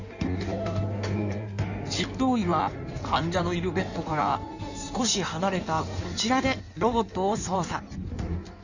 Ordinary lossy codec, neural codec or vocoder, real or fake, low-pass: none; codec, 16 kHz in and 24 kHz out, 1.1 kbps, FireRedTTS-2 codec; fake; 7.2 kHz